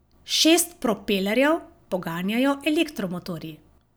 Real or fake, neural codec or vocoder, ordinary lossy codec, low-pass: real; none; none; none